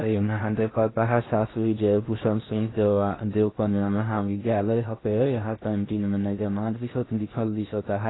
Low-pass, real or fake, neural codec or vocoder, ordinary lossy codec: 7.2 kHz; fake; codec, 16 kHz in and 24 kHz out, 0.6 kbps, FocalCodec, streaming, 4096 codes; AAC, 16 kbps